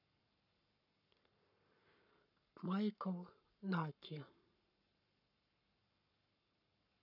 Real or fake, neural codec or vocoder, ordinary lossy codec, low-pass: fake; codec, 44.1 kHz, 7.8 kbps, Pupu-Codec; none; 5.4 kHz